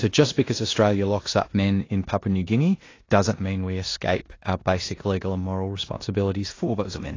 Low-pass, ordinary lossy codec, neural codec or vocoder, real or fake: 7.2 kHz; AAC, 32 kbps; codec, 16 kHz in and 24 kHz out, 0.9 kbps, LongCat-Audio-Codec, four codebook decoder; fake